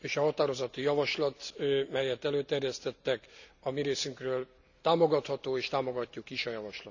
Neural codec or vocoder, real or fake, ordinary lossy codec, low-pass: none; real; none; 7.2 kHz